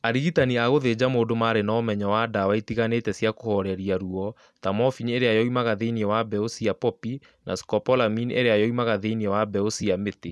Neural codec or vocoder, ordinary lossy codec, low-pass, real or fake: none; none; none; real